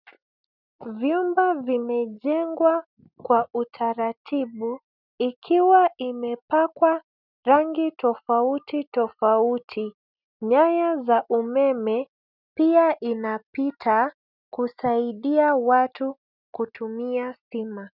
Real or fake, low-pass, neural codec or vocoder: real; 5.4 kHz; none